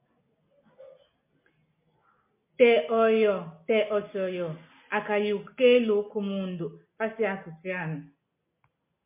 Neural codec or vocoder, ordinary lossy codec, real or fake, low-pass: none; MP3, 24 kbps; real; 3.6 kHz